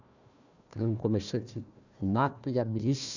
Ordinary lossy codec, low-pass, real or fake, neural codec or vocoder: none; 7.2 kHz; fake; codec, 16 kHz, 1 kbps, FunCodec, trained on Chinese and English, 50 frames a second